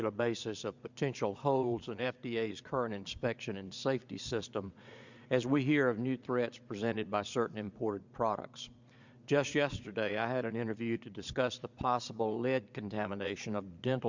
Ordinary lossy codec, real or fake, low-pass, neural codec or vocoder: Opus, 64 kbps; fake; 7.2 kHz; vocoder, 22.05 kHz, 80 mel bands, Vocos